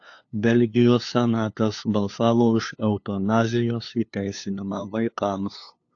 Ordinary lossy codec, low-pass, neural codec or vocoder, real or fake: AAC, 48 kbps; 7.2 kHz; codec, 16 kHz, 2 kbps, FunCodec, trained on LibriTTS, 25 frames a second; fake